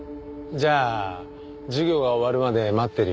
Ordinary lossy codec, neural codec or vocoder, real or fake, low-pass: none; none; real; none